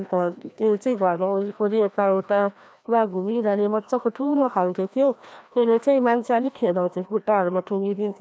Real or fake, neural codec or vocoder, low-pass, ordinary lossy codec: fake; codec, 16 kHz, 1 kbps, FreqCodec, larger model; none; none